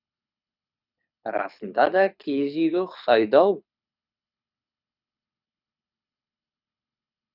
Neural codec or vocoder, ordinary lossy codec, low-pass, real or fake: codec, 24 kHz, 6 kbps, HILCodec; none; 5.4 kHz; fake